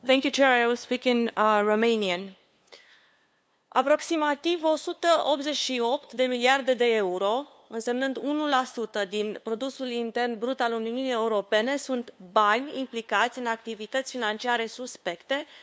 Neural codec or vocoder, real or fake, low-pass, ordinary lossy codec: codec, 16 kHz, 2 kbps, FunCodec, trained on LibriTTS, 25 frames a second; fake; none; none